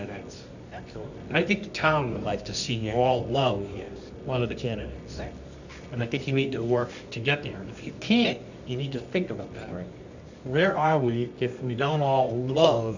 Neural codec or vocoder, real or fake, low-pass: codec, 24 kHz, 0.9 kbps, WavTokenizer, medium music audio release; fake; 7.2 kHz